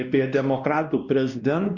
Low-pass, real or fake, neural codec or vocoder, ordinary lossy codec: 7.2 kHz; fake; codec, 16 kHz, 1 kbps, X-Codec, WavLM features, trained on Multilingual LibriSpeech; Opus, 64 kbps